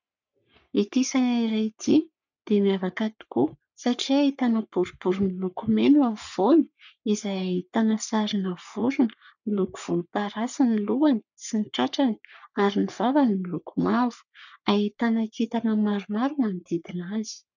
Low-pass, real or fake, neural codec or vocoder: 7.2 kHz; fake; codec, 44.1 kHz, 3.4 kbps, Pupu-Codec